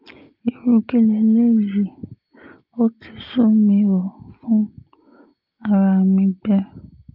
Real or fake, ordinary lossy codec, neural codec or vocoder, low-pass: real; Opus, 24 kbps; none; 5.4 kHz